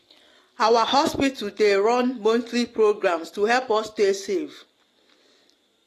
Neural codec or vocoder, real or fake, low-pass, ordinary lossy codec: none; real; 14.4 kHz; AAC, 48 kbps